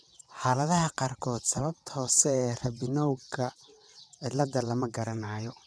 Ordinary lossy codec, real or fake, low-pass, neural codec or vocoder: none; fake; none; vocoder, 22.05 kHz, 80 mel bands, WaveNeXt